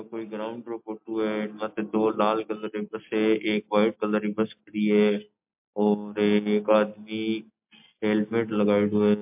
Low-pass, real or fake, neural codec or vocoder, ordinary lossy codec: 3.6 kHz; real; none; MP3, 32 kbps